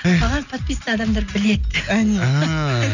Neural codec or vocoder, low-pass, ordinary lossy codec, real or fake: none; 7.2 kHz; none; real